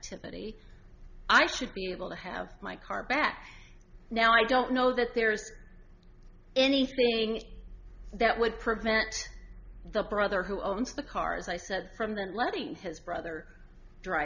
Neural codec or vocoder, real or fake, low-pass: none; real; 7.2 kHz